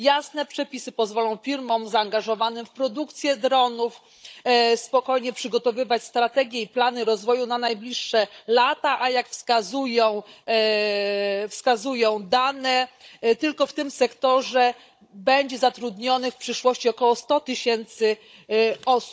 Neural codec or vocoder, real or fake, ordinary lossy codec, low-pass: codec, 16 kHz, 16 kbps, FunCodec, trained on Chinese and English, 50 frames a second; fake; none; none